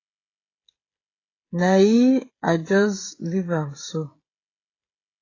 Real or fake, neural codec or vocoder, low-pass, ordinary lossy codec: fake; codec, 16 kHz, 16 kbps, FreqCodec, smaller model; 7.2 kHz; AAC, 32 kbps